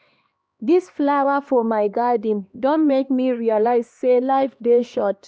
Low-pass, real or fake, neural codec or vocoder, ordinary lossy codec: none; fake; codec, 16 kHz, 2 kbps, X-Codec, HuBERT features, trained on LibriSpeech; none